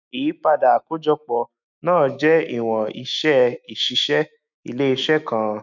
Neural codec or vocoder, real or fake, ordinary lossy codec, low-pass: autoencoder, 48 kHz, 128 numbers a frame, DAC-VAE, trained on Japanese speech; fake; none; 7.2 kHz